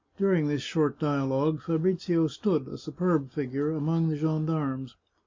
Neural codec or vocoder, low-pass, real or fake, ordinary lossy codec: none; 7.2 kHz; real; MP3, 64 kbps